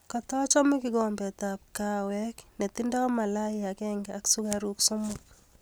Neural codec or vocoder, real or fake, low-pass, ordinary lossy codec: none; real; none; none